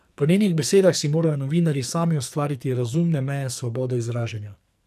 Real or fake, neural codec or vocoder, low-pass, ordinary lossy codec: fake; codec, 44.1 kHz, 2.6 kbps, SNAC; 14.4 kHz; none